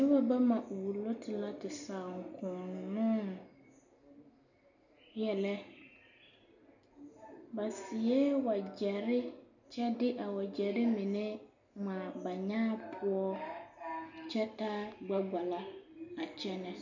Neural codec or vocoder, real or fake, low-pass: none; real; 7.2 kHz